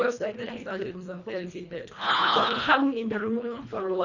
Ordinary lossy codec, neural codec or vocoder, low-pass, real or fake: none; codec, 24 kHz, 1.5 kbps, HILCodec; 7.2 kHz; fake